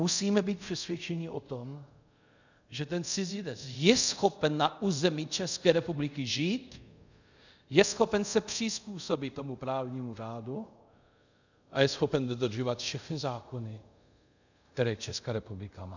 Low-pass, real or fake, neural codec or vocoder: 7.2 kHz; fake; codec, 24 kHz, 0.5 kbps, DualCodec